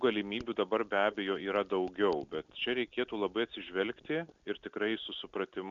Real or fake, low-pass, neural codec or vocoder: real; 7.2 kHz; none